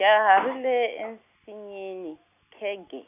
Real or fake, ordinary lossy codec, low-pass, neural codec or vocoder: real; none; 3.6 kHz; none